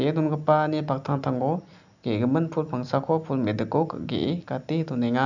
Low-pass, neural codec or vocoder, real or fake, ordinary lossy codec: 7.2 kHz; none; real; none